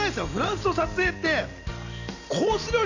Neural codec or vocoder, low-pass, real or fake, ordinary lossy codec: none; 7.2 kHz; real; none